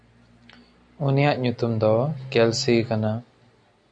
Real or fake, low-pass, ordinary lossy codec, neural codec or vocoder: real; 9.9 kHz; MP3, 96 kbps; none